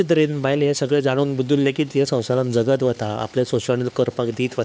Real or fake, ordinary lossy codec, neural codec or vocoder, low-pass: fake; none; codec, 16 kHz, 4 kbps, X-Codec, HuBERT features, trained on LibriSpeech; none